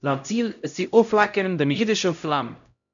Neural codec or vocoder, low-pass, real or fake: codec, 16 kHz, 0.5 kbps, X-Codec, HuBERT features, trained on LibriSpeech; 7.2 kHz; fake